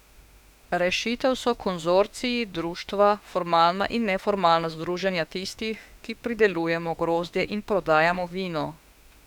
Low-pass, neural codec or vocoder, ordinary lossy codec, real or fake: 19.8 kHz; autoencoder, 48 kHz, 32 numbers a frame, DAC-VAE, trained on Japanese speech; none; fake